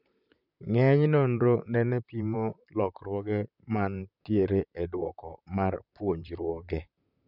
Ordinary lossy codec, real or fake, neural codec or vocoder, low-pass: none; fake; vocoder, 44.1 kHz, 128 mel bands, Pupu-Vocoder; 5.4 kHz